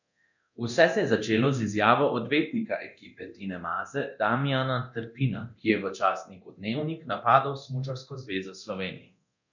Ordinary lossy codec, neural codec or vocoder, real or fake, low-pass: none; codec, 24 kHz, 0.9 kbps, DualCodec; fake; 7.2 kHz